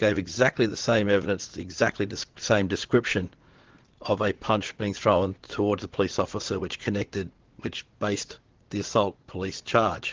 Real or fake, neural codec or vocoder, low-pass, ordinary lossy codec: fake; vocoder, 44.1 kHz, 80 mel bands, Vocos; 7.2 kHz; Opus, 16 kbps